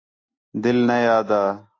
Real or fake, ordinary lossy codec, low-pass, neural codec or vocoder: real; AAC, 32 kbps; 7.2 kHz; none